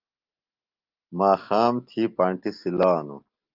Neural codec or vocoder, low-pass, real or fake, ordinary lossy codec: none; 5.4 kHz; real; Opus, 32 kbps